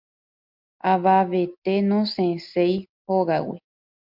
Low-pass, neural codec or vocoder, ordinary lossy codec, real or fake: 5.4 kHz; none; MP3, 48 kbps; real